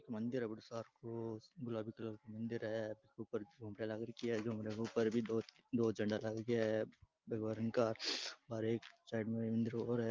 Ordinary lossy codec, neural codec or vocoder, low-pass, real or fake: Opus, 24 kbps; none; 7.2 kHz; real